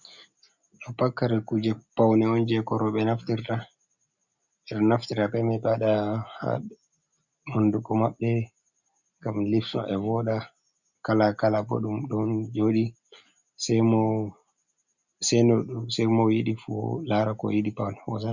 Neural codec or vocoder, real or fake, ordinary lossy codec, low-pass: none; real; Opus, 64 kbps; 7.2 kHz